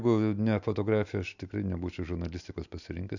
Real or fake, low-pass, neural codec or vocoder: real; 7.2 kHz; none